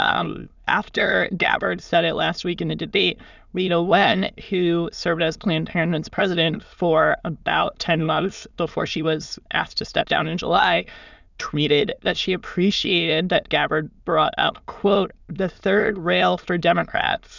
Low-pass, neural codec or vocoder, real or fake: 7.2 kHz; autoencoder, 22.05 kHz, a latent of 192 numbers a frame, VITS, trained on many speakers; fake